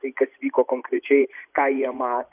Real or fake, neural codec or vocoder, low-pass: fake; vocoder, 24 kHz, 100 mel bands, Vocos; 3.6 kHz